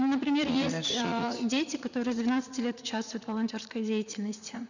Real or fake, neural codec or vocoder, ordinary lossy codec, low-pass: fake; vocoder, 44.1 kHz, 80 mel bands, Vocos; none; 7.2 kHz